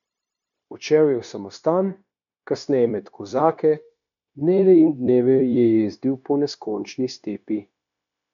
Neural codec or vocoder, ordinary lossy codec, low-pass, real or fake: codec, 16 kHz, 0.9 kbps, LongCat-Audio-Codec; none; 7.2 kHz; fake